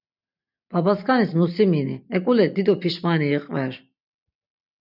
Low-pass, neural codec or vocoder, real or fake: 5.4 kHz; none; real